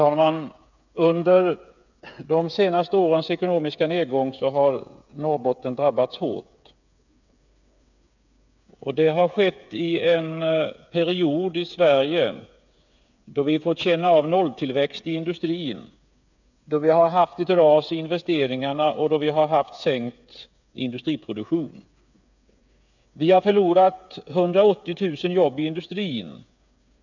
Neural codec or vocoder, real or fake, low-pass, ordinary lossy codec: codec, 16 kHz, 8 kbps, FreqCodec, smaller model; fake; 7.2 kHz; none